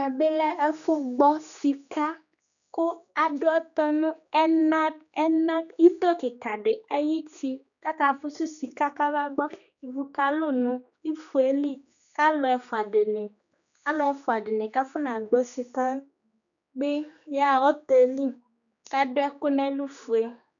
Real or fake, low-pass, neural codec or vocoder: fake; 7.2 kHz; codec, 16 kHz, 2 kbps, X-Codec, HuBERT features, trained on general audio